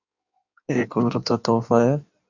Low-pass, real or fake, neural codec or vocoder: 7.2 kHz; fake; codec, 16 kHz in and 24 kHz out, 1.1 kbps, FireRedTTS-2 codec